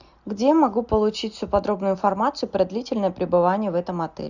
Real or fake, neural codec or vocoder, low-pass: real; none; 7.2 kHz